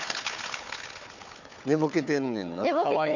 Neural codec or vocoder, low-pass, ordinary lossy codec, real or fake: codec, 16 kHz, 4 kbps, FunCodec, trained on Chinese and English, 50 frames a second; 7.2 kHz; none; fake